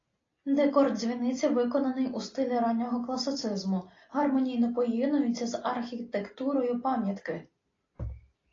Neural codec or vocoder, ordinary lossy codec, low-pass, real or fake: none; AAC, 32 kbps; 7.2 kHz; real